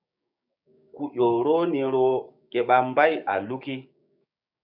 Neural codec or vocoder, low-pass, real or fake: codec, 16 kHz, 6 kbps, DAC; 5.4 kHz; fake